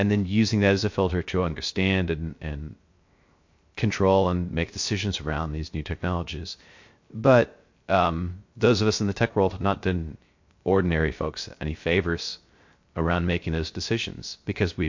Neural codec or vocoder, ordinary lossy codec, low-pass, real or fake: codec, 16 kHz, 0.3 kbps, FocalCodec; MP3, 48 kbps; 7.2 kHz; fake